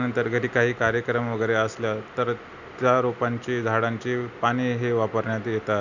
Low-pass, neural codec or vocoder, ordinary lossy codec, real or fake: 7.2 kHz; none; none; real